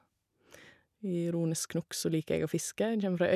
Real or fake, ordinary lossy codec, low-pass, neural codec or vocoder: real; none; 14.4 kHz; none